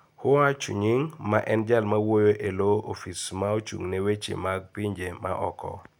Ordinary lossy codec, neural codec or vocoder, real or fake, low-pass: none; none; real; 19.8 kHz